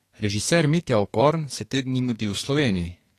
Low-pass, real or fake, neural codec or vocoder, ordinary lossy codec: 14.4 kHz; fake; codec, 44.1 kHz, 2.6 kbps, SNAC; AAC, 48 kbps